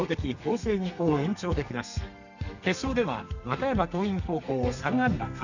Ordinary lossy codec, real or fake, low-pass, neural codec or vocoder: none; fake; 7.2 kHz; codec, 32 kHz, 1.9 kbps, SNAC